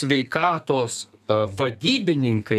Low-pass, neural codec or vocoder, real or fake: 14.4 kHz; codec, 32 kHz, 1.9 kbps, SNAC; fake